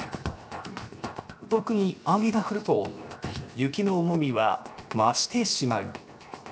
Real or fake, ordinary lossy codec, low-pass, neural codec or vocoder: fake; none; none; codec, 16 kHz, 0.7 kbps, FocalCodec